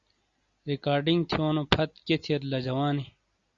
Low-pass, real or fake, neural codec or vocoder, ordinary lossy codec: 7.2 kHz; real; none; Opus, 64 kbps